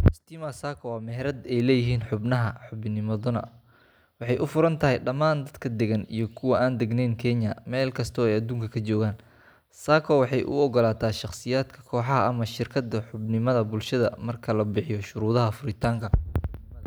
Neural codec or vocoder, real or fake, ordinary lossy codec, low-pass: none; real; none; none